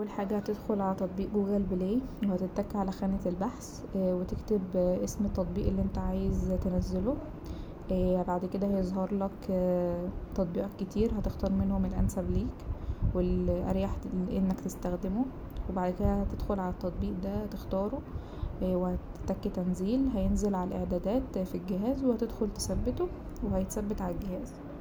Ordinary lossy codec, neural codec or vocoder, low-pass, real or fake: none; none; none; real